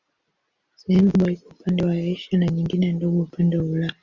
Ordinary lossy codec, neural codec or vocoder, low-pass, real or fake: Opus, 64 kbps; none; 7.2 kHz; real